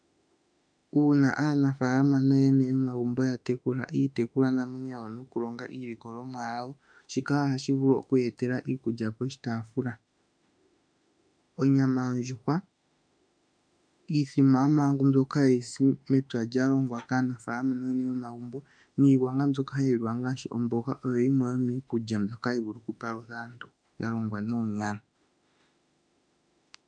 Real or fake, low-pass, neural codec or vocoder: fake; 9.9 kHz; autoencoder, 48 kHz, 32 numbers a frame, DAC-VAE, trained on Japanese speech